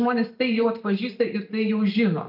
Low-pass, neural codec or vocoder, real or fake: 5.4 kHz; none; real